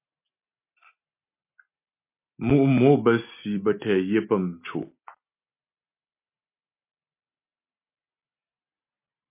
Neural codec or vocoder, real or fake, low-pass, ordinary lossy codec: none; real; 3.6 kHz; MP3, 24 kbps